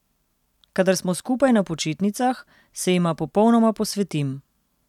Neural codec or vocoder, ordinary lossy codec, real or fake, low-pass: none; none; real; 19.8 kHz